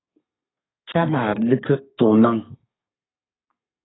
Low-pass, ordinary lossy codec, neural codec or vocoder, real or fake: 7.2 kHz; AAC, 16 kbps; codec, 32 kHz, 1.9 kbps, SNAC; fake